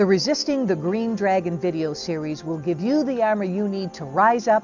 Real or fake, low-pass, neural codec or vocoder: real; 7.2 kHz; none